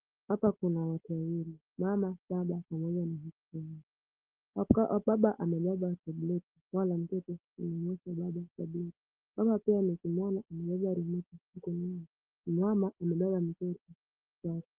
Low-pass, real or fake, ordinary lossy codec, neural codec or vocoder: 3.6 kHz; real; Opus, 16 kbps; none